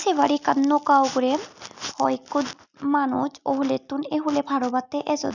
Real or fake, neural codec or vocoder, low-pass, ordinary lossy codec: real; none; 7.2 kHz; none